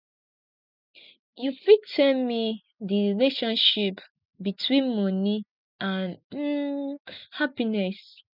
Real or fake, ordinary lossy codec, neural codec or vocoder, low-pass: real; none; none; 5.4 kHz